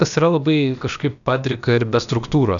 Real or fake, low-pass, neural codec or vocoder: fake; 7.2 kHz; codec, 16 kHz, about 1 kbps, DyCAST, with the encoder's durations